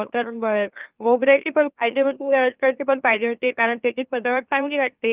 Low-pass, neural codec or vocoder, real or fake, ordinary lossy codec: 3.6 kHz; autoencoder, 44.1 kHz, a latent of 192 numbers a frame, MeloTTS; fake; Opus, 24 kbps